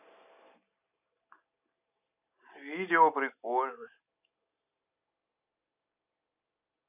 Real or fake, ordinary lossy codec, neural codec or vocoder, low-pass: real; none; none; 3.6 kHz